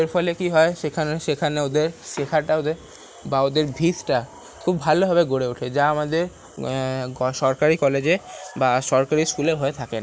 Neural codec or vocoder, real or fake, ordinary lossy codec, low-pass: none; real; none; none